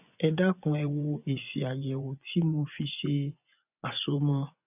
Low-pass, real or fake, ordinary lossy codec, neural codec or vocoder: 3.6 kHz; fake; none; vocoder, 24 kHz, 100 mel bands, Vocos